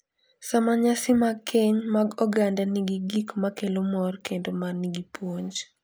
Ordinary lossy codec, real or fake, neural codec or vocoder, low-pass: none; real; none; none